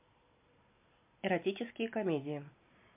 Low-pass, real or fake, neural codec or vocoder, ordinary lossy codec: 3.6 kHz; real; none; MP3, 32 kbps